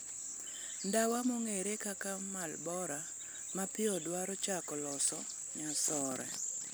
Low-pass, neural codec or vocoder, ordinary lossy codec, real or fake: none; vocoder, 44.1 kHz, 128 mel bands every 256 samples, BigVGAN v2; none; fake